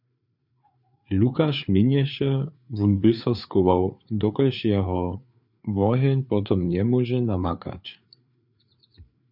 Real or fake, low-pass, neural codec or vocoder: fake; 5.4 kHz; codec, 16 kHz, 4 kbps, FreqCodec, larger model